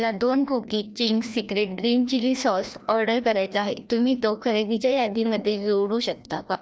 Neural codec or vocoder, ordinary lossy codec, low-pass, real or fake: codec, 16 kHz, 1 kbps, FreqCodec, larger model; none; none; fake